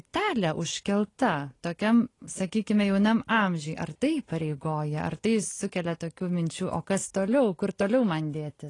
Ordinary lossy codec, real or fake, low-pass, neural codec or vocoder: AAC, 32 kbps; real; 10.8 kHz; none